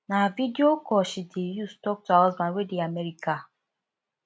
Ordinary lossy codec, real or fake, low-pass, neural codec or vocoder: none; real; none; none